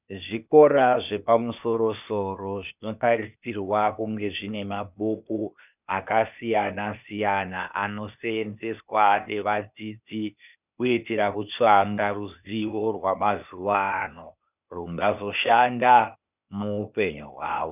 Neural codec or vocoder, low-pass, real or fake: codec, 16 kHz, 0.8 kbps, ZipCodec; 3.6 kHz; fake